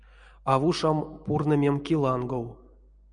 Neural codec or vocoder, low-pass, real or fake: none; 9.9 kHz; real